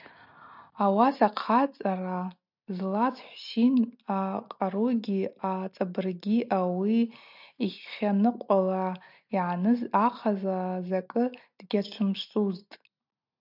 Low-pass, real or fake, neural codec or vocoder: 5.4 kHz; real; none